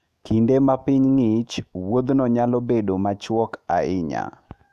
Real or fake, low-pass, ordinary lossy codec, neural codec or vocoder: fake; 14.4 kHz; MP3, 96 kbps; autoencoder, 48 kHz, 128 numbers a frame, DAC-VAE, trained on Japanese speech